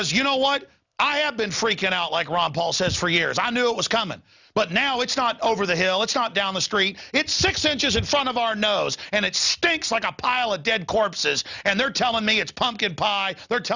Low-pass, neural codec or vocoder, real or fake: 7.2 kHz; none; real